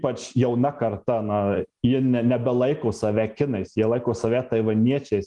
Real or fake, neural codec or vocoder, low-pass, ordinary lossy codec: real; none; 10.8 kHz; Opus, 24 kbps